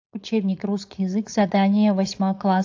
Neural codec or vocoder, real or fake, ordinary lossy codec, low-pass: codec, 16 kHz, 4.8 kbps, FACodec; fake; AAC, 48 kbps; 7.2 kHz